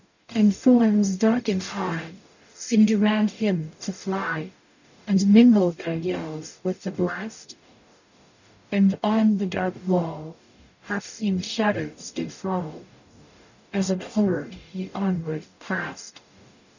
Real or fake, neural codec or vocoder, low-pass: fake; codec, 44.1 kHz, 0.9 kbps, DAC; 7.2 kHz